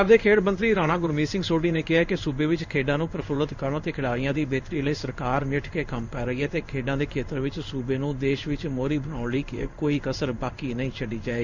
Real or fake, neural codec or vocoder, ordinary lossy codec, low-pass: fake; codec, 16 kHz in and 24 kHz out, 1 kbps, XY-Tokenizer; none; 7.2 kHz